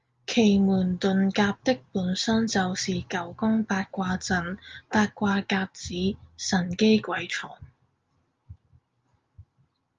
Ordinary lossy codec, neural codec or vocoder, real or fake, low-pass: Opus, 32 kbps; none; real; 7.2 kHz